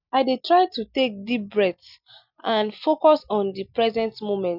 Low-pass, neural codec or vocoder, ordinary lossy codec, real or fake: 5.4 kHz; none; none; real